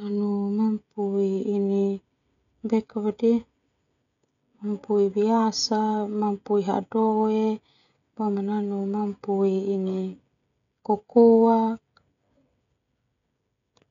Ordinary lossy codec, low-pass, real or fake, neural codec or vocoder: none; 7.2 kHz; fake; codec, 16 kHz, 16 kbps, FreqCodec, smaller model